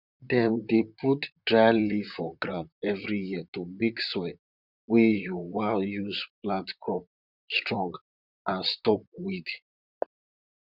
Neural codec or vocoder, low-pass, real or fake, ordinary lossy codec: vocoder, 44.1 kHz, 128 mel bands, Pupu-Vocoder; 5.4 kHz; fake; none